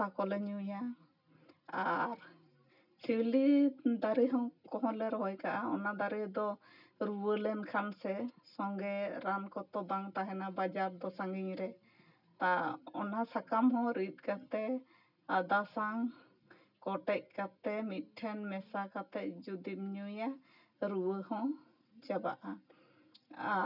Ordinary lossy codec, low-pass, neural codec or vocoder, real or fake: none; 5.4 kHz; none; real